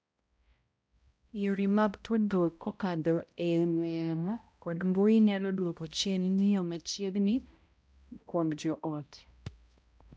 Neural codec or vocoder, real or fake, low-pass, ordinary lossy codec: codec, 16 kHz, 0.5 kbps, X-Codec, HuBERT features, trained on balanced general audio; fake; none; none